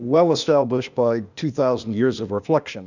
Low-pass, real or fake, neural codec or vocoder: 7.2 kHz; fake; codec, 16 kHz, 0.8 kbps, ZipCodec